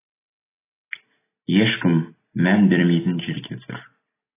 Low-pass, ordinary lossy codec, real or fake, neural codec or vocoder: 3.6 kHz; AAC, 16 kbps; real; none